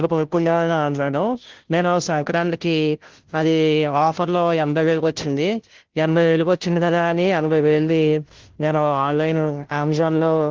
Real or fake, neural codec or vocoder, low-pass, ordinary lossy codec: fake; codec, 16 kHz, 0.5 kbps, FunCodec, trained on Chinese and English, 25 frames a second; 7.2 kHz; Opus, 16 kbps